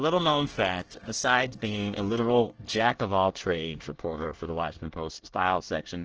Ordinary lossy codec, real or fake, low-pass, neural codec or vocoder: Opus, 16 kbps; fake; 7.2 kHz; codec, 24 kHz, 1 kbps, SNAC